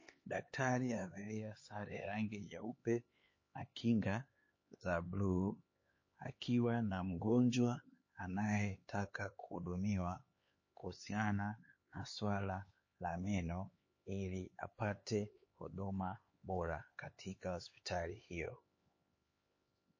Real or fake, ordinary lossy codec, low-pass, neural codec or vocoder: fake; MP3, 32 kbps; 7.2 kHz; codec, 16 kHz, 4 kbps, X-Codec, HuBERT features, trained on LibriSpeech